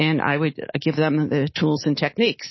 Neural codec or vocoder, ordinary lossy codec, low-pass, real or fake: codec, 24 kHz, 3.1 kbps, DualCodec; MP3, 24 kbps; 7.2 kHz; fake